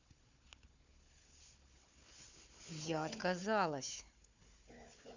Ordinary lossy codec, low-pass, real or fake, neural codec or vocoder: none; 7.2 kHz; fake; codec, 16 kHz, 4 kbps, FunCodec, trained on Chinese and English, 50 frames a second